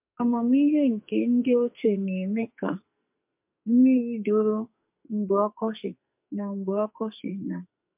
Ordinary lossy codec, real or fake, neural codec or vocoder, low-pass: MP3, 32 kbps; fake; codec, 44.1 kHz, 2.6 kbps, SNAC; 3.6 kHz